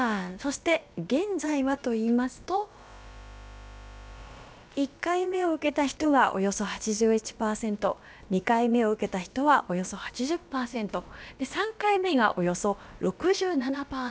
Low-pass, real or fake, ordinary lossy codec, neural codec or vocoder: none; fake; none; codec, 16 kHz, about 1 kbps, DyCAST, with the encoder's durations